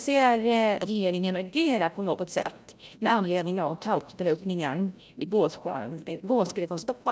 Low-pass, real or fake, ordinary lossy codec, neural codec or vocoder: none; fake; none; codec, 16 kHz, 0.5 kbps, FreqCodec, larger model